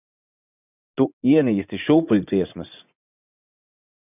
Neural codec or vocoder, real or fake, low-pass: vocoder, 44.1 kHz, 80 mel bands, Vocos; fake; 3.6 kHz